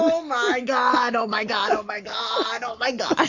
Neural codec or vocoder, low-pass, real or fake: codec, 44.1 kHz, 7.8 kbps, Pupu-Codec; 7.2 kHz; fake